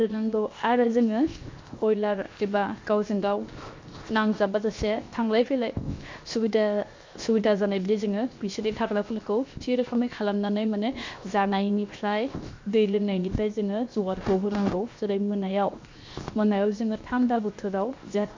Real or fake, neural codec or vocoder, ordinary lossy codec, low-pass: fake; codec, 16 kHz, 0.7 kbps, FocalCodec; MP3, 48 kbps; 7.2 kHz